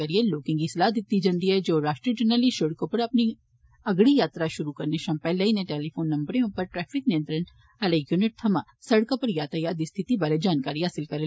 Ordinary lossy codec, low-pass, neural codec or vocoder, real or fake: none; none; none; real